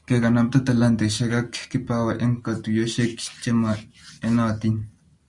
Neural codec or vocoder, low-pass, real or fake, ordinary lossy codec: none; 10.8 kHz; real; MP3, 96 kbps